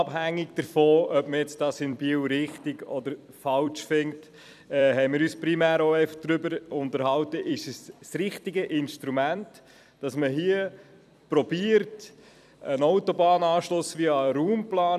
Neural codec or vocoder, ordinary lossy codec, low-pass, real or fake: vocoder, 44.1 kHz, 128 mel bands every 256 samples, BigVGAN v2; none; 14.4 kHz; fake